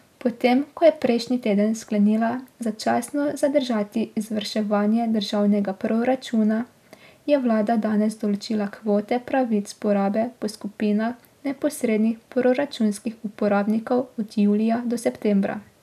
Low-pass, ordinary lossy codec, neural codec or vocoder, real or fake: 14.4 kHz; none; none; real